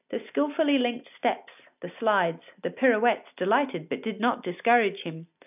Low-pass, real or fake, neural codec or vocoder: 3.6 kHz; real; none